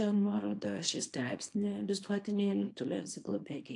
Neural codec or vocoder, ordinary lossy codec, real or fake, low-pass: codec, 24 kHz, 0.9 kbps, WavTokenizer, small release; AAC, 48 kbps; fake; 10.8 kHz